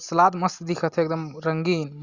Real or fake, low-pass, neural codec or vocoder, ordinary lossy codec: real; 7.2 kHz; none; Opus, 64 kbps